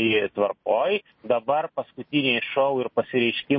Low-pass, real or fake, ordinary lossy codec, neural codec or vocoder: 7.2 kHz; real; MP3, 24 kbps; none